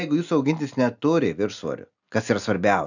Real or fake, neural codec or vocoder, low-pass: real; none; 7.2 kHz